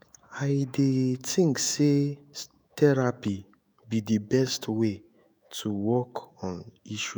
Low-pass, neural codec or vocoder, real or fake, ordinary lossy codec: none; autoencoder, 48 kHz, 128 numbers a frame, DAC-VAE, trained on Japanese speech; fake; none